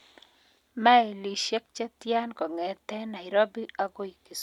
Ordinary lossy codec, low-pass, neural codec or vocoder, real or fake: none; 19.8 kHz; none; real